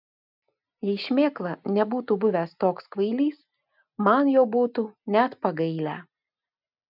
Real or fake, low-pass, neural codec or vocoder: real; 5.4 kHz; none